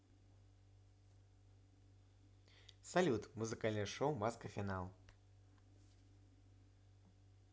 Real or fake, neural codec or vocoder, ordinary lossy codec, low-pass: real; none; none; none